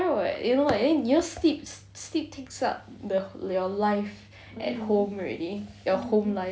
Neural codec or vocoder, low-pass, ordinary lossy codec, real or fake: none; none; none; real